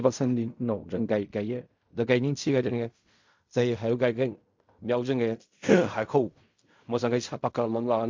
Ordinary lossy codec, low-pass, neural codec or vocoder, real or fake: MP3, 64 kbps; 7.2 kHz; codec, 16 kHz in and 24 kHz out, 0.4 kbps, LongCat-Audio-Codec, fine tuned four codebook decoder; fake